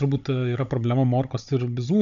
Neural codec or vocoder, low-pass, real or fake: codec, 16 kHz, 16 kbps, FunCodec, trained on Chinese and English, 50 frames a second; 7.2 kHz; fake